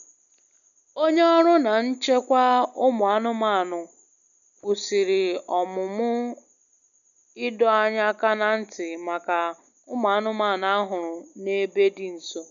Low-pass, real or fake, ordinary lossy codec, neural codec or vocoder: 7.2 kHz; real; none; none